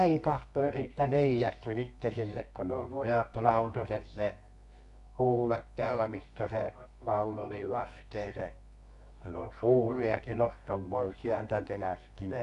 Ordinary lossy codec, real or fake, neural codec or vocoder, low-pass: none; fake; codec, 24 kHz, 0.9 kbps, WavTokenizer, medium music audio release; 10.8 kHz